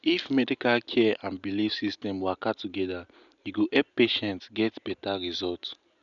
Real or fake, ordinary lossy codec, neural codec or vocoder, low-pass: real; none; none; 7.2 kHz